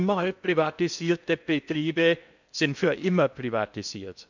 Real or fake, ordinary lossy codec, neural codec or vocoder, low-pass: fake; none; codec, 16 kHz in and 24 kHz out, 0.8 kbps, FocalCodec, streaming, 65536 codes; 7.2 kHz